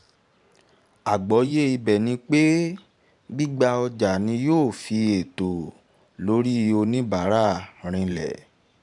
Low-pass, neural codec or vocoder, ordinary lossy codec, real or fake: 10.8 kHz; vocoder, 44.1 kHz, 128 mel bands every 512 samples, BigVGAN v2; none; fake